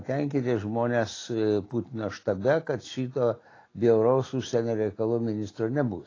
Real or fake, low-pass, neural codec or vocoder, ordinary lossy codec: real; 7.2 kHz; none; AAC, 32 kbps